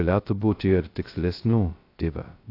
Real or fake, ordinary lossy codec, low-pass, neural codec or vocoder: fake; AAC, 32 kbps; 5.4 kHz; codec, 16 kHz, 0.2 kbps, FocalCodec